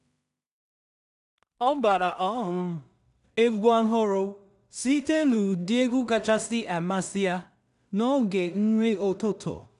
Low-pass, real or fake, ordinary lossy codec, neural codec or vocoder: 10.8 kHz; fake; none; codec, 16 kHz in and 24 kHz out, 0.4 kbps, LongCat-Audio-Codec, two codebook decoder